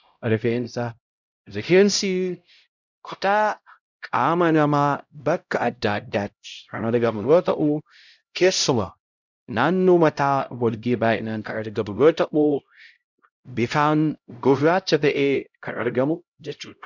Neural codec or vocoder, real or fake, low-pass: codec, 16 kHz, 0.5 kbps, X-Codec, HuBERT features, trained on LibriSpeech; fake; 7.2 kHz